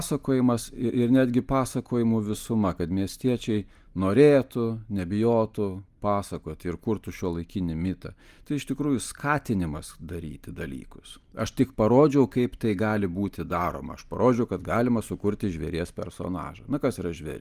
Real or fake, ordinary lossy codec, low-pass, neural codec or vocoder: real; Opus, 32 kbps; 14.4 kHz; none